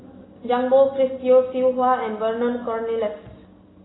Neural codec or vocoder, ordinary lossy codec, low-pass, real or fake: none; AAC, 16 kbps; 7.2 kHz; real